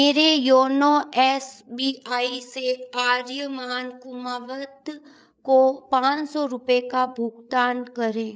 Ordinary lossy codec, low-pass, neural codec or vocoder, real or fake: none; none; codec, 16 kHz, 8 kbps, FreqCodec, larger model; fake